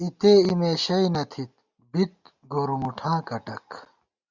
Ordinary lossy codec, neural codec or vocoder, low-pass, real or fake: Opus, 64 kbps; none; 7.2 kHz; real